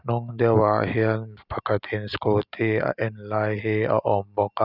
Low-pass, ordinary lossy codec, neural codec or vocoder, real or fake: 5.4 kHz; none; none; real